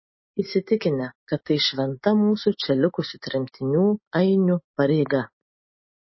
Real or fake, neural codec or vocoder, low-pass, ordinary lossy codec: real; none; 7.2 kHz; MP3, 24 kbps